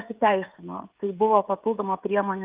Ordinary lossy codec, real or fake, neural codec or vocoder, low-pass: Opus, 32 kbps; fake; codec, 16 kHz, 16 kbps, FreqCodec, smaller model; 3.6 kHz